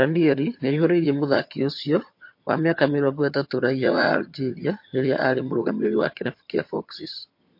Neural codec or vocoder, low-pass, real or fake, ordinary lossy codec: vocoder, 22.05 kHz, 80 mel bands, HiFi-GAN; 5.4 kHz; fake; MP3, 32 kbps